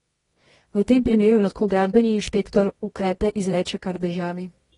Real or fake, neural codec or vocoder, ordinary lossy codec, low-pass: fake; codec, 24 kHz, 0.9 kbps, WavTokenizer, medium music audio release; AAC, 32 kbps; 10.8 kHz